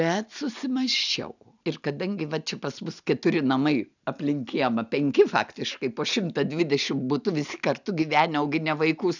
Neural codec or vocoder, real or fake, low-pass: none; real; 7.2 kHz